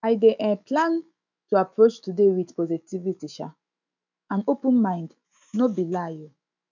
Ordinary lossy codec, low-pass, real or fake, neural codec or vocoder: none; 7.2 kHz; fake; autoencoder, 48 kHz, 128 numbers a frame, DAC-VAE, trained on Japanese speech